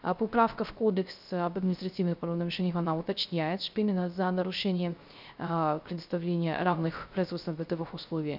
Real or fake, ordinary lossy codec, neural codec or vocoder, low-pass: fake; none; codec, 16 kHz, 0.3 kbps, FocalCodec; 5.4 kHz